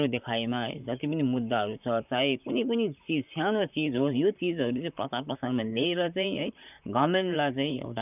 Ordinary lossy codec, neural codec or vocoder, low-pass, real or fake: none; vocoder, 44.1 kHz, 128 mel bands, Pupu-Vocoder; 3.6 kHz; fake